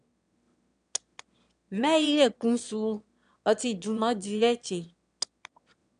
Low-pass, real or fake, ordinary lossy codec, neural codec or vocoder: 9.9 kHz; fake; MP3, 96 kbps; autoencoder, 22.05 kHz, a latent of 192 numbers a frame, VITS, trained on one speaker